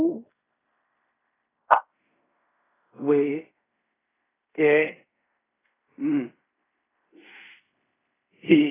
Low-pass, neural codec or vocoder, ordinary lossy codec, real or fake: 3.6 kHz; codec, 16 kHz in and 24 kHz out, 0.4 kbps, LongCat-Audio-Codec, fine tuned four codebook decoder; AAC, 16 kbps; fake